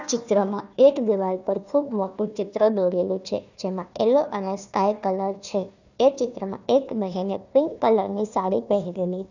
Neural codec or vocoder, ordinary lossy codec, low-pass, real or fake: codec, 16 kHz, 1 kbps, FunCodec, trained on Chinese and English, 50 frames a second; none; 7.2 kHz; fake